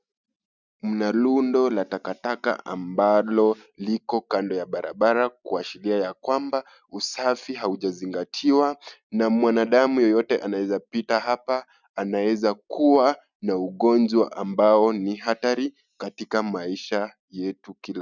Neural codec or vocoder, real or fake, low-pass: none; real; 7.2 kHz